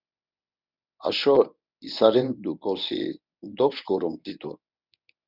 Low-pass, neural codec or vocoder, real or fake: 5.4 kHz; codec, 24 kHz, 0.9 kbps, WavTokenizer, medium speech release version 1; fake